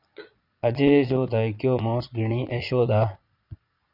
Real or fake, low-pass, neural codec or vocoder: fake; 5.4 kHz; vocoder, 22.05 kHz, 80 mel bands, Vocos